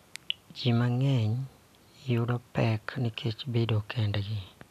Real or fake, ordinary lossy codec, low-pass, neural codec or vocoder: real; none; 14.4 kHz; none